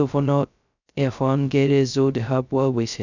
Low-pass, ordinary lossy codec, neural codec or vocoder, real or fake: 7.2 kHz; none; codec, 16 kHz, 0.2 kbps, FocalCodec; fake